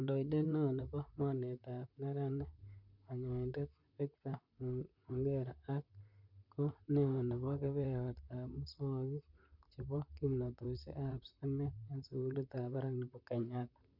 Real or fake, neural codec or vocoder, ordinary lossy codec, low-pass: fake; vocoder, 44.1 kHz, 128 mel bands, Pupu-Vocoder; none; 5.4 kHz